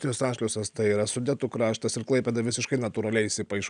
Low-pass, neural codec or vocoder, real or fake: 9.9 kHz; none; real